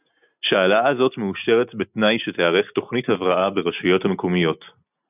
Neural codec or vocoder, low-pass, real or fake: none; 3.6 kHz; real